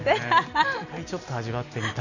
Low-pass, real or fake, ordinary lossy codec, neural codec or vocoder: 7.2 kHz; real; none; none